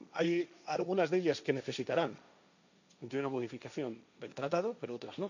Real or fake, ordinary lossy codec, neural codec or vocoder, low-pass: fake; none; codec, 16 kHz, 1.1 kbps, Voila-Tokenizer; 7.2 kHz